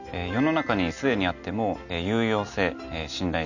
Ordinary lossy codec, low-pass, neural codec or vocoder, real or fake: none; 7.2 kHz; none; real